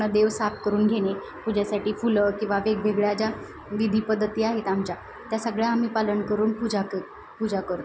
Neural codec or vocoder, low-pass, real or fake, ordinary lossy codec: none; none; real; none